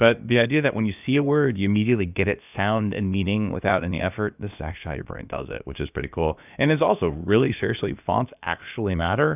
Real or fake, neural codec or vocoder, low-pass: fake; codec, 16 kHz, about 1 kbps, DyCAST, with the encoder's durations; 3.6 kHz